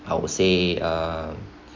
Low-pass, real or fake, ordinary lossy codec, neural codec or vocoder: 7.2 kHz; real; MP3, 64 kbps; none